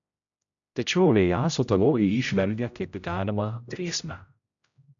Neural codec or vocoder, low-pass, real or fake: codec, 16 kHz, 0.5 kbps, X-Codec, HuBERT features, trained on general audio; 7.2 kHz; fake